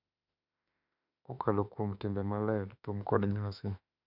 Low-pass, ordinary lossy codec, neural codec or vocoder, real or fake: 5.4 kHz; none; autoencoder, 48 kHz, 32 numbers a frame, DAC-VAE, trained on Japanese speech; fake